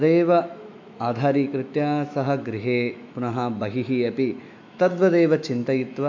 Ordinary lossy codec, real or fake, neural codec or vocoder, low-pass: AAC, 48 kbps; fake; autoencoder, 48 kHz, 128 numbers a frame, DAC-VAE, trained on Japanese speech; 7.2 kHz